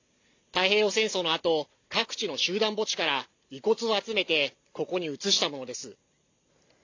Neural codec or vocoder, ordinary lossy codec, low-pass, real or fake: none; AAC, 48 kbps; 7.2 kHz; real